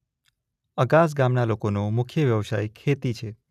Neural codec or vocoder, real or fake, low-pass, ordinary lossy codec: none; real; 14.4 kHz; none